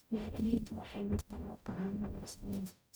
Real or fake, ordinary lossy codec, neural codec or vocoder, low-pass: fake; none; codec, 44.1 kHz, 0.9 kbps, DAC; none